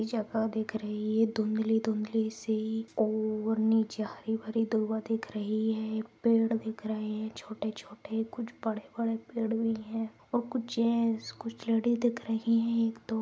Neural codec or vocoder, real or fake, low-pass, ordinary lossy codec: none; real; none; none